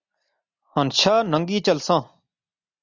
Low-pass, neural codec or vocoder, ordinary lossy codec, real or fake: 7.2 kHz; none; Opus, 64 kbps; real